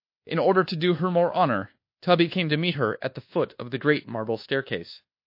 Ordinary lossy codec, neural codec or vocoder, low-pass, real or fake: MP3, 32 kbps; codec, 24 kHz, 1.2 kbps, DualCodec; 5.4 kHz; fake